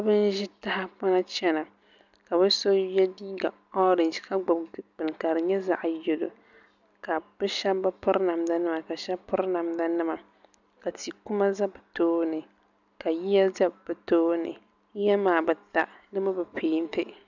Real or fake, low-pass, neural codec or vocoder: real; 7.2 kHz; none